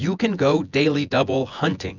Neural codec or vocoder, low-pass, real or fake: vocoder, 24 kHz, 100 mel bands, Vocos; 7.2 kHz; fake